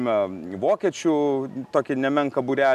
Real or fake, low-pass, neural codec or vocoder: real; 14.4 kHz; none